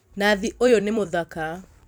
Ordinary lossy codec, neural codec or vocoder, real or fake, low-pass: none; vocoder, 44.1 kHz, 128 mel bands every 256 samples, BigVGAN v2; fake; none